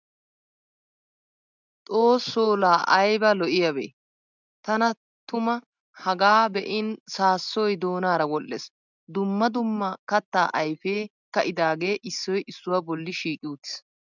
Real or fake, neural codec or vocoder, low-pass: real; none; 7.2 kHz